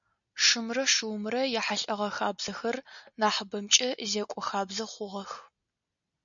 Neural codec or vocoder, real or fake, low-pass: none; real; 7.2 kHz